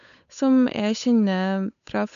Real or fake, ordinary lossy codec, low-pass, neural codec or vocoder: fake; MP3, 96 kbps; 7.2 kHz; codec, 16 kHz, 8 kbps, FunCodec, trained on Chinese and English, 25 frames a second